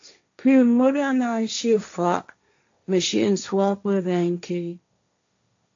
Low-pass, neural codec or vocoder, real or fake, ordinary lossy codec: 7.2 kHz; codec, 16 kHz, 1.1 kbps, Voila-Tokenizer; fake; AAC, 64 kbps